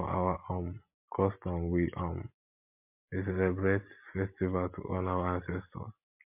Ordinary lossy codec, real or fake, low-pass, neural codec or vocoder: MP3, 32 kbps; real; 3.6 kHz; none